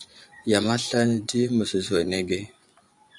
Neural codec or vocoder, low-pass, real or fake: vocoder, 24 kHz, 100 mel bands, Vocos; 10.8 kHz; fake